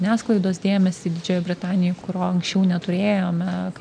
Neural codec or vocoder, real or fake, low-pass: none; real; 9.9 kHz